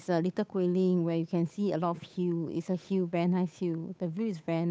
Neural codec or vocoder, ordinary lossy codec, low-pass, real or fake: codec, 16 kHz, 8 kbps, FunCodec, trained on Chinese and English, 25 frames a second; none; none; fake